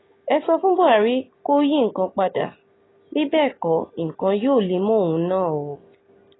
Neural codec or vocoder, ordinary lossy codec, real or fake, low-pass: none; AAC, 16 kbps; real; 7.2 kHz